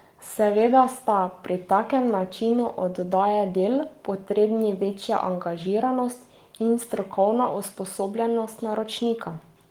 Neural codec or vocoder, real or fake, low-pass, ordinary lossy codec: codec, 44.1 kHz, 7.8 kbps, Pupu-Codec; fake; 19.8 kHz; Opus, 24 kbps